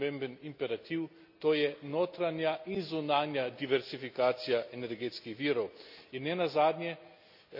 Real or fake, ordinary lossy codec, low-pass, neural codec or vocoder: real; AAC, 48 kbps; 5.4 kHz; none